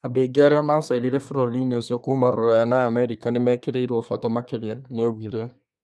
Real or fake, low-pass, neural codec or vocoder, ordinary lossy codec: fake; none; codec, 24 kHz, 1 kbps, SNAC; none